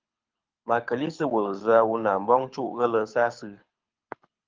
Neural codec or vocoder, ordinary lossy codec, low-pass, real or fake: codec, 24 kHz, 6 kbps, HILCodec; Opus, 24 kbps; 7.2 kHz; fake